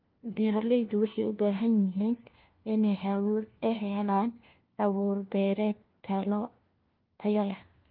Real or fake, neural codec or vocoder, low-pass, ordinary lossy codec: fake; codec, 16 kHz, 1 kbps, FunCodec, trained on LibriTTS, 50 frames a second; 5.4 kHz; Opus, 24 kbps